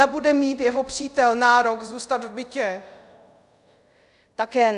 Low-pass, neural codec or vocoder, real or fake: 10.8 kHz; codec, 24 kHz, 0.5 kbps, DualCodec; fake